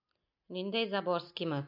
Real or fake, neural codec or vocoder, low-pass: real; none; 5.4 kHz